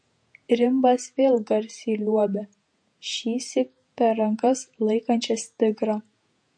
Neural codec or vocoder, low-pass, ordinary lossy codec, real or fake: vocoder, 48 kHz, 128 mel bands, Vocos; 9.9 kHz; MP3, 48 kbps; fake